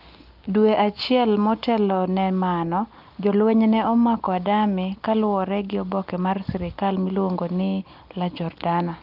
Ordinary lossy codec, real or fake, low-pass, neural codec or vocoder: Opus, 32 kbps; real; 5.4 kHz; none